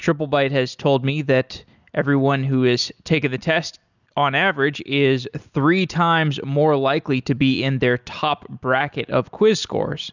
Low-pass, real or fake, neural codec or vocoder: 7.2 kHz; real; none